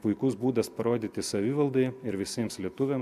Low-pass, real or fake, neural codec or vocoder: 14.4 kHz; real; none